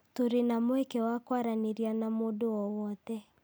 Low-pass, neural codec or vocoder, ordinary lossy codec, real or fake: none; none; none; real